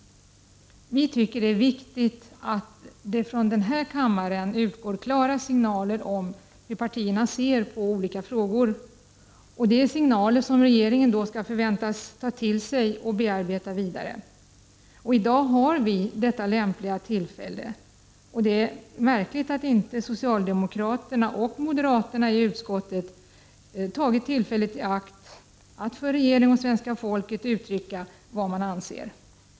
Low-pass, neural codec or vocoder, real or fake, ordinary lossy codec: none; none; real; none